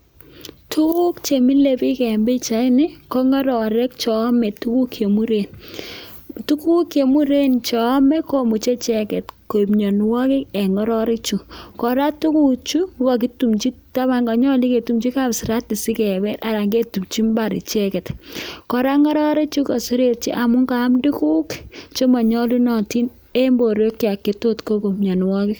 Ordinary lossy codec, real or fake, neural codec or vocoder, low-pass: none; real; none; none